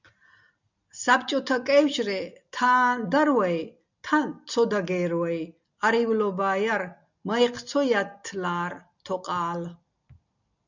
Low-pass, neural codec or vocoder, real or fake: 7.2 kHz; none; real